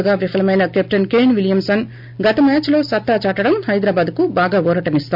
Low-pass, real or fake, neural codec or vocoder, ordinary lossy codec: 5.4 kHz; real; none; MP3, 48 kbps